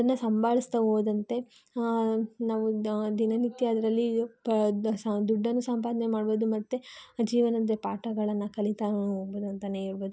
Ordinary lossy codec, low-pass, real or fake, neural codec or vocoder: none; none; real; none